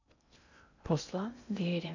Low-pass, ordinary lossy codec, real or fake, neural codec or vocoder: 7.2 kHz; none; fake; codec, 16 kHz in and 24 kHz out, 0.6 kbps, FocalCodec, streaming, 2048 codes